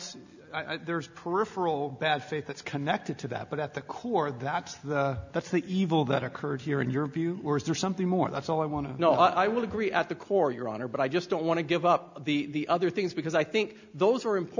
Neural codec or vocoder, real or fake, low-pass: none; real; 7.2 kHz